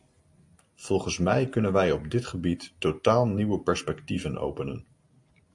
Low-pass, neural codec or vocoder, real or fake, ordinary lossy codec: 10.8 kHz; none; real; MP3, 48 kbps